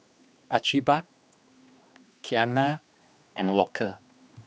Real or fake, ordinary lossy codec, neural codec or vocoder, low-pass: fake; none; codec, 16 kHz, 2 kbps, X-Codec, HuBERT features, trained on general audio; none